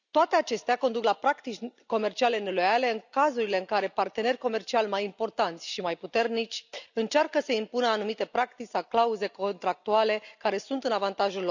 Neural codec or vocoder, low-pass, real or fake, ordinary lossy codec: none; 7.2 kHz; real; none